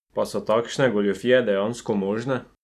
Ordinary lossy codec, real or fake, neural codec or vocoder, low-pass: none; real; none; 14.4 kHz